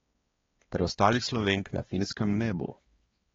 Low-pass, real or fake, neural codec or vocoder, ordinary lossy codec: 7.2 kHz; fake; codec, 16 kHz, 2 kbps, X-Codec, HuBERT features, trained on balanced general audio; AAC, 24 kbps